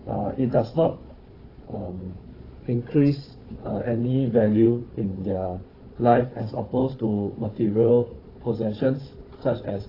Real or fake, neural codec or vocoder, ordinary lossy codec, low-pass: fake; codec, 16 kHz, 4 kbps, FunCodec, trained on Chinese and English, 50 frames a second; AAC, 24 kbps; 5.4 kHz